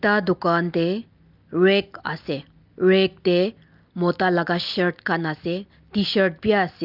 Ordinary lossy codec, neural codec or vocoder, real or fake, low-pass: Opus, 24 kbps; none; real; 5.4 kHz